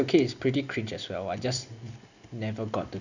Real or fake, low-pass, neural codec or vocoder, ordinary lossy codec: real; 7.2 kHz; none; none